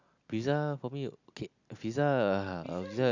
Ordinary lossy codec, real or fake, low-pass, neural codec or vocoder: none; real; 7.2 kHz; none